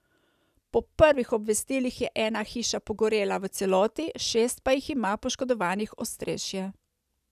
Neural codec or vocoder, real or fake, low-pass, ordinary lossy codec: none; real; 14.4 kHz; none